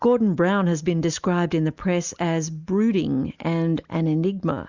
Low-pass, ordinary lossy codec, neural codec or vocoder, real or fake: 7.2 kHz; Opus, 64 kbps; none; real